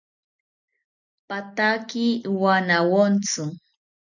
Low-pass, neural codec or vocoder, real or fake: 7.2 kHz; none; real